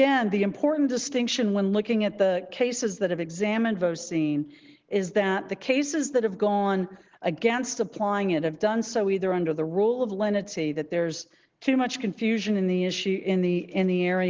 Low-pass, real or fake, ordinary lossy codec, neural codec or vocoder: 7.2 kHz; real; Opus, 16 kbps; none